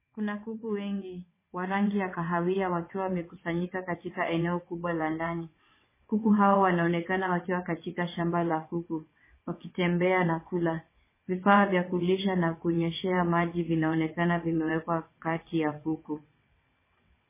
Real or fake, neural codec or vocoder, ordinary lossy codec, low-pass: fake; vocoder, 22.05 kHz, 80 mel bands, WaveNeXt; MP3, 16 kbps; 3.6 kHz